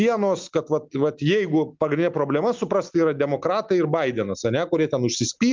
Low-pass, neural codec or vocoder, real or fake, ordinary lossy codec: 7.2 kHz; none; real; Opus, 32 kbps